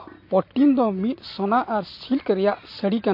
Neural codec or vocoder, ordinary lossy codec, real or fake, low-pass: none; MP3, 32 kbps; real; 5.4 kHz